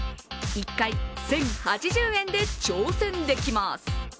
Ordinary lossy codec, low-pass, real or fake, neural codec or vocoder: none; none; real; none